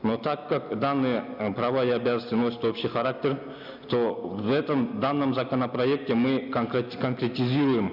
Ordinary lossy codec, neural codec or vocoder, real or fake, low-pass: none; none; real; 5.4 kHz